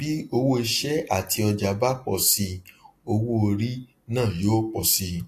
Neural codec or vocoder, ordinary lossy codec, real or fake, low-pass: none; AAC, 48 kbps; real; 14.4 kHz